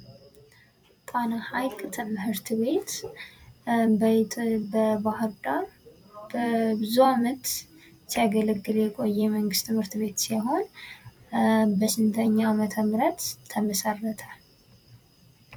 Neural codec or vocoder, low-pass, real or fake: vocoder, 44.1 kHz, 128 mel bands every 256 samples, BigVGAN v2; 19.8 kHz; fake